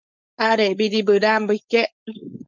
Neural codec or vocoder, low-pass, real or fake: codec, 16 kHz, 4.8 kbps, FACodec; 7.2 kHz; fake